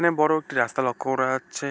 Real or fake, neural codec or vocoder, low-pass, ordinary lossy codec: real; none; none; none